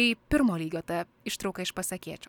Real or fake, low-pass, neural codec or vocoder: real; 19.8 kHz; none